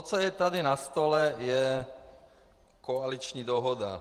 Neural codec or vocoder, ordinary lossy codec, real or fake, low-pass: vocoder, 48 kHz, 128 mel bands, Vocos; Opus, 24 kbps; fake; 14.4 kHz